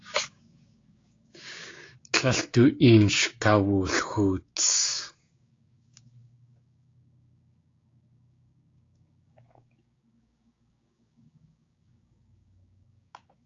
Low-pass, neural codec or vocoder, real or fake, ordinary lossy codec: 7.2 kHz; codec, 16 kHz, 6 kbps, DAC; fake; AAC, 32 kbps